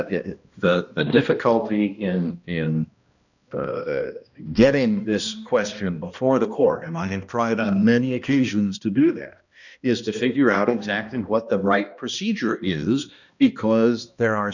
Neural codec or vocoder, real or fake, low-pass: codec, 16 kHz, 1 kbps, X-Codec, HuBERT features, trained on balanced general audio; fake; 7.2 kHz